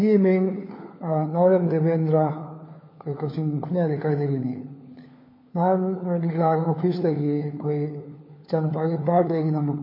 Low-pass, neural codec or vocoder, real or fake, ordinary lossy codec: 5.4 kHz; codec, 16 kHz, 4 kbps, FunCodec, trained on LibriTTS, 50 frames a second; fake; MP3, 24 kbps